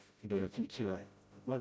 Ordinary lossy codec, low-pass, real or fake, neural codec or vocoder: none; none; fake; codec, 16 kHz, 0.5 kbps, FreqCodec, smaller model